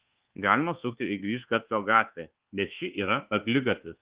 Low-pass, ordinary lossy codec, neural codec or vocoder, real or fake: 3.6 kHz; Opus, 16 kbps; codec, 24 kHz, 1.2 kbps, DualCodec; fake